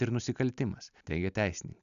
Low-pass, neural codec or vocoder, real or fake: 7.2 kHz; none; real